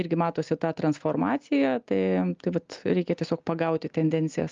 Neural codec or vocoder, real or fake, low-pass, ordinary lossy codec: none; real; 7.2 kHz; Opus, 32 kbps